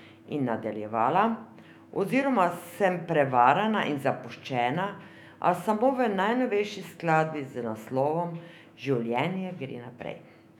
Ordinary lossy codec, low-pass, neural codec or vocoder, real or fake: none; 19.8 kHz; autoencoder, 48 kHz, 128 numbers a frame, DAC-VAE, trained on Japanese speech; fake